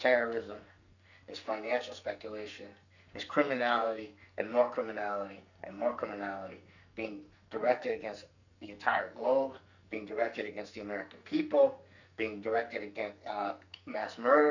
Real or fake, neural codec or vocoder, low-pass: fake; codec, 44.1 kHz, 2.6 kbps, SNAC; 7.2 kHz